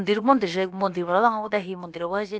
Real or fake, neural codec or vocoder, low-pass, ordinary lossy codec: fake; codec, 16 kHz, about 1 kbps, DyCAST, with the encoder's durations; none; none